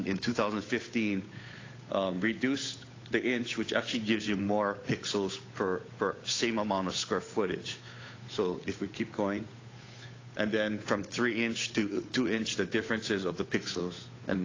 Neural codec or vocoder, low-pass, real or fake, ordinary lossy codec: codec, 16 kHz, 8 kbps, FunCodec, trained on Chinese and English, 25 frames a second; 7.2 kHz; fake; AAC, 32 kbps